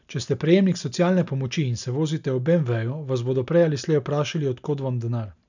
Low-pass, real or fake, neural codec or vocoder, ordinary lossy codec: 7.2 kHz; real; none; none